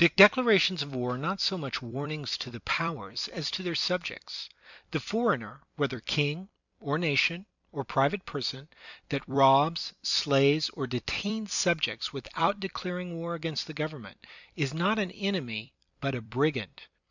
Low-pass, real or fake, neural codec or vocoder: 7.2 kHz; fake; vocoder, 44.1 kHz, 128 mel bands every 256 samples, BigVGAN v2